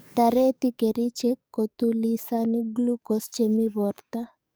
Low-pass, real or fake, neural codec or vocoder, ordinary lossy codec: none; fake; codec, 44.1 kHz, 7.8 kbps, DAC; none